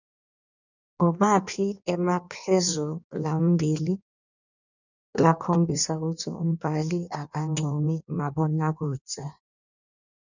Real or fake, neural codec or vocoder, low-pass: fake; codec, 16 kHz in and 24 kHz out, 1.1 kbps, FireRedTTS-2 codec; 7.2 kHz